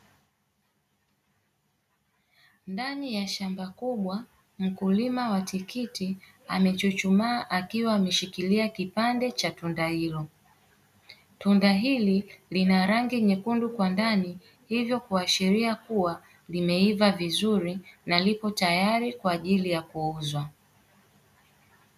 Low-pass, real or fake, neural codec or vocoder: 14.4 kHz; real; none